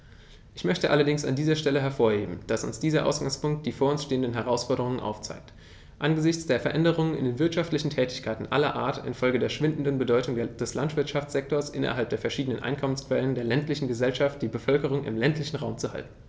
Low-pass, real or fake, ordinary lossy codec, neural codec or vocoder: none; real; none; none